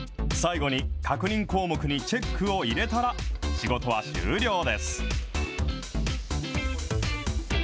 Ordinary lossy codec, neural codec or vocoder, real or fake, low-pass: none; none; real; none